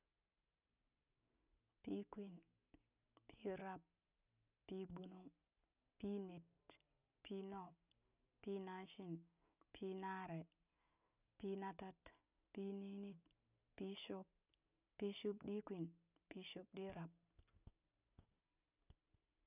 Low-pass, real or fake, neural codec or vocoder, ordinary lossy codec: 3.6 kHz; real; none; none